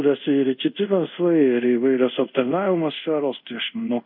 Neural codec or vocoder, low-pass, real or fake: codec, 24 kHz, 0.5 kbps, DualCodec; 5.4 kHz; fake